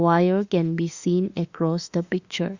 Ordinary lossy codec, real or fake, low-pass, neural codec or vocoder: Opus, 64 kbps; fake; 7.2 kHz; autoencoder, 48 kHz, 32 numbers a frame, DAC-VAE, trained on Japanese speech